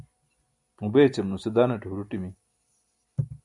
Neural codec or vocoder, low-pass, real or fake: none; 10.8 kHz; real